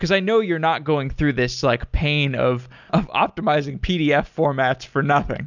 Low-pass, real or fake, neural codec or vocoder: 7.2 kHz; real; none